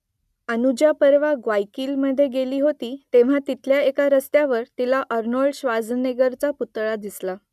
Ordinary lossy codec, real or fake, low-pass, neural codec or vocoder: none; real; 14.4 kHz; none